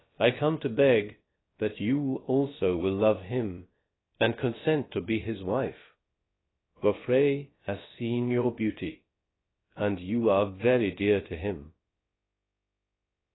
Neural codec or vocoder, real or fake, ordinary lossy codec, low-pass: codec, 16 kHz, about 1 kbps, DyCAST, with the encoder's durations; fake; AAC, 16 kbps; 7.2 kHz